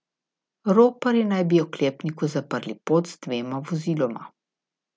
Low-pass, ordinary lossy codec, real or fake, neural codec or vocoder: none; none; real; none